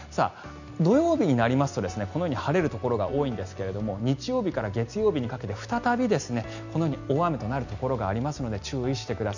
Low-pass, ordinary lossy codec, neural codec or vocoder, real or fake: 7.2 kHz; none; none; real